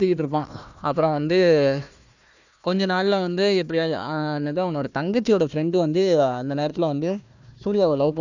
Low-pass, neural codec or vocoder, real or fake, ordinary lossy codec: 7.2 kHz; codec, 16 kHz, 1 kbps, FunCodec, trained on Chinese and English, 50 frames a second; fake; none